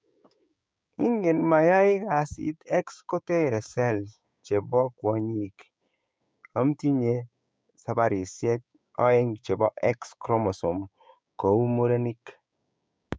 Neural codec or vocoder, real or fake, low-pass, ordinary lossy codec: codec, 16 kHz, 6 kbps, DAC; fake; none; none